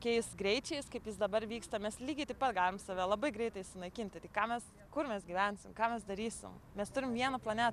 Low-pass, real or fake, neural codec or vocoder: 14.4 kHz; real; none